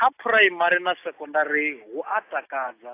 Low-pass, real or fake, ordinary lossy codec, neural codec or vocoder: 3.6 kHz; real; AAC, 24 kbps; none